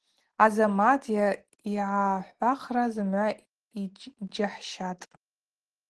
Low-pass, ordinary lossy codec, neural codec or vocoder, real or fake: 10.8 kHz; Opus, 16 kbps; none; real